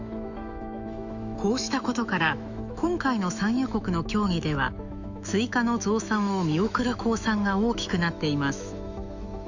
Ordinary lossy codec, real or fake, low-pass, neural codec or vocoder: none; fake; 7.2 kHz; autoencoder, 48 kHz, 128 numbers a frame, DAC-VAE, trained on Japanese speech